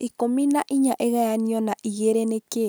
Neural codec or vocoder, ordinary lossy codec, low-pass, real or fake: none; none; none; real